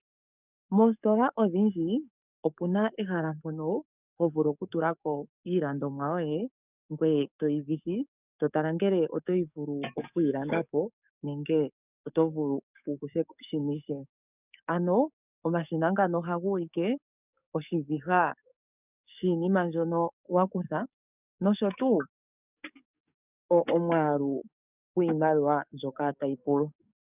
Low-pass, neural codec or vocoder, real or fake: 3.6 kHz; codec, 44.1 kHz, 7.8 kbps, DAC; fake